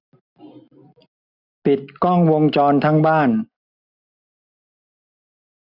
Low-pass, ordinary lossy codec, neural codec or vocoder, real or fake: 5.4 kHz; none; none; real